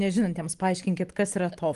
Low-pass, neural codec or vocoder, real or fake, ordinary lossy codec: 10.8 kHz; none; real; Opus, 24 kbps